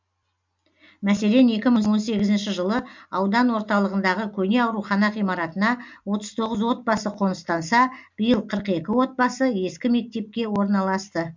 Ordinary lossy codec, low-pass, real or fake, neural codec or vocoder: MP3, 64 kbps; 7.2 kHz; real; none